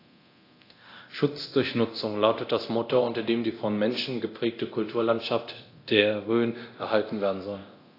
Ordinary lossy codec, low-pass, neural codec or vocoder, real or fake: AAC, 32 kbps; 5.4 kHz; codec, 24 kHz, 0.9 kbps, DualCodec; fake